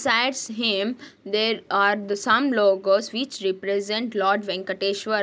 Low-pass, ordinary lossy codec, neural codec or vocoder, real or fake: none; none; none; real